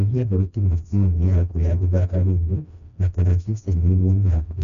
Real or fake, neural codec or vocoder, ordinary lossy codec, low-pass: fake; codec, 16 kHz, 1 kbps, FreqCodec, smaller model; none; 7.2 kHz